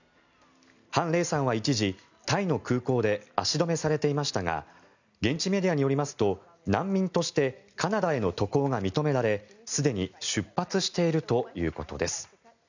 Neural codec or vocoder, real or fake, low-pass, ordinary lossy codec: none; real; 7.2 kHz; none